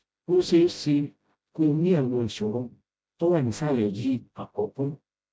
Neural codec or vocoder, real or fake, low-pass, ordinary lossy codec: codec, 16 kHz, 0.5 kbps, FreqCodec, smaller model; fake; none; none